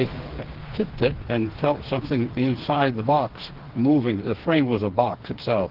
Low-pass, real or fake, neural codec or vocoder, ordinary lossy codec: 5.4 kHz; fake; codec, 16 kHz, 4 kbps, FreqCodec, smaller model; Opus, 16 kbps